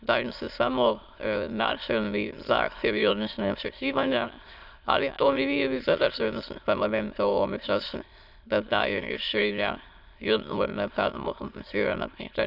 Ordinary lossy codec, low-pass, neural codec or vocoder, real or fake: none; 5.4 kHz; autoencoder, 22.05 kHz, a latent of 192 numbers a frame, VITS, trained on many speakers; fake